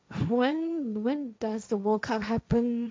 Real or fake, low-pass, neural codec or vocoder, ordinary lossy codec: fake; none; codec, 16 kHz, 1.1 kbps, Voila-Tokenizer; none